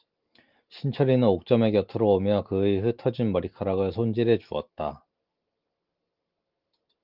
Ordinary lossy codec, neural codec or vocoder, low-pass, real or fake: Opus, 32 kbps; none; 5.4 kHz; real